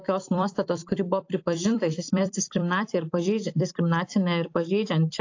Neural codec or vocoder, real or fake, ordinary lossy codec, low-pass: vocoder, 44.1 kHz, 128 mel bands every 256 samples, BigVGAN v2; fake; AAC, 48 kbps; 7.2 kHz